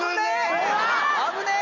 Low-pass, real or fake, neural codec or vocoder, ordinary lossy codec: 7.2 kHz; real; none; none